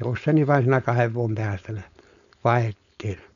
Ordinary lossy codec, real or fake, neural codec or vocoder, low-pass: none; fake; codec, 16 kHz, 4.8 kbps, FACodec; 7.2 kHz